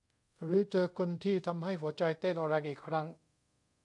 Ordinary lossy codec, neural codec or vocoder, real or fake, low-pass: MP3, 64 kbps; codec, 24 kHz, 0.5 kbps, DualCodec; fake; 10.8 kHz